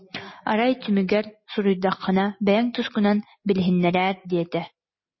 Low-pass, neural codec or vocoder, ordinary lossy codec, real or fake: 7.2 kHz; none; MP3, 24 kbps; real